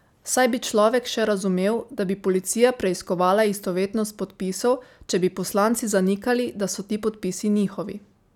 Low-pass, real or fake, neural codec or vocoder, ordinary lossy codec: 19.8 kHz; real; none; none